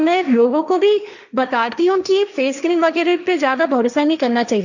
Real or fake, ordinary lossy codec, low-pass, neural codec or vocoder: fake; none; 7.2 kHz; codec, 16 kHz, 1.1 kbps, Voila-Tokenizer